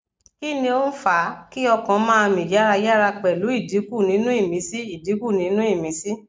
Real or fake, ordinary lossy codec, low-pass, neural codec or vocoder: real; none; none; none